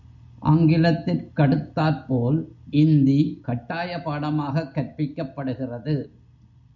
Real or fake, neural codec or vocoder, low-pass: real; none; 7.2 kHz